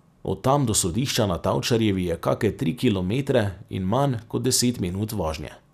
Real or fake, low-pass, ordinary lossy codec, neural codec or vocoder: real; 14.4 kHz; none; none